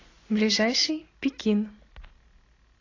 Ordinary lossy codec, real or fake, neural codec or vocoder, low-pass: AAC, 32 kbps; real; none; 7.2 kHz